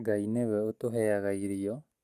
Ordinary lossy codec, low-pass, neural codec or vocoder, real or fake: Opus, 64 kbps; 14.4 kHz; none; real